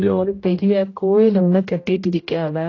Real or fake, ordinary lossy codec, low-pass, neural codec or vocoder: fake; AAC, 32 kbps; 7.2 kHz; codec, 16 kHz, 0.5 kbps, X-Codec, HuBERT features, trained on general audio